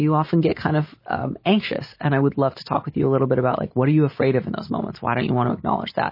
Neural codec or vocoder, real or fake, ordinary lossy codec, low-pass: none; real; MP3, 24 kbps; 5.4 kHz